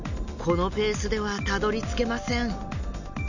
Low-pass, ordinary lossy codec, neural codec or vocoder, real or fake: 7.2 kHz; none; none; real